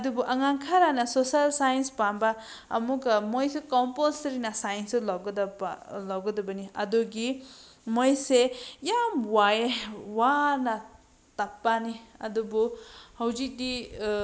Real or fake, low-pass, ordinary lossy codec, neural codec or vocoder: real; none; none; none